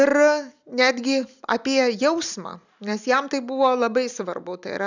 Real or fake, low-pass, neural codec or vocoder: real; 7.2 kHz; none